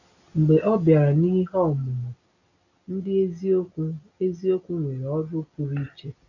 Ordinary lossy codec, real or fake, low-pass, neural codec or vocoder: none; real; 7.2 kHz; none